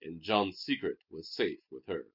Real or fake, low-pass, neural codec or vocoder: real; 5.4 kHz; none